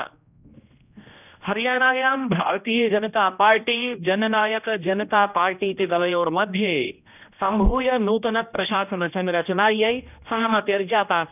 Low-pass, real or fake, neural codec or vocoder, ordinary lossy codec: 3.6 kHz; fake; codec, 16 kHz, 1 kbps, X-Codec, HuBERT features, trained on general audio; none